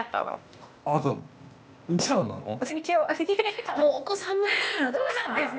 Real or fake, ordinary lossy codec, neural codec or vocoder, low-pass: fake; none; codec, 16 kHz, 0.8 kbps, ZipCodec; none